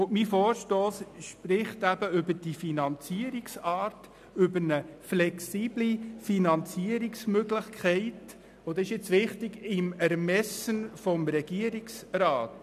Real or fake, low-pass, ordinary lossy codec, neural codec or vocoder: real; 14.4 kHz; none; none